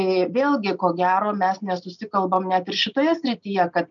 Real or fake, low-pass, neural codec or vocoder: real; 7.2 kHz; none